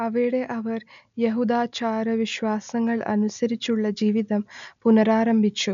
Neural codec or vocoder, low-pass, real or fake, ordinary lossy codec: none; 7.2 kHz; real; MP3, 64 kbps